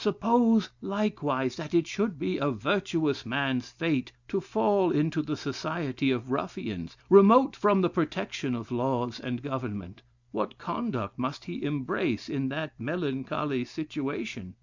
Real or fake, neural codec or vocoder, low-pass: real; none; 7.2 kHz